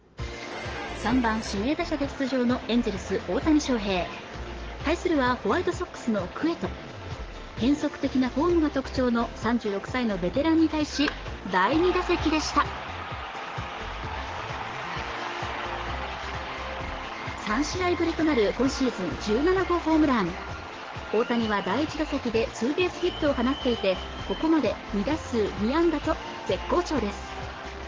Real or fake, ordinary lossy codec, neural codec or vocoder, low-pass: fake; Opus, 16 kbps; codec, 44.1 kHz, 7.8 kbps, DAC; 7.2 kHz